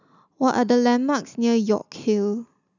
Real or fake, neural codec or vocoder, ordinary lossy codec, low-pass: real; none; none; 7.2 kHz